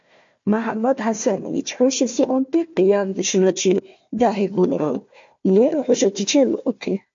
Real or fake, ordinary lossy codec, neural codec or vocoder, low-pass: fake; MP3, 48 kbps; codec, 16 kHz, 1 kbps, FunCodec, trained on Chinese and English, 50 frames a second; 7.2 kHz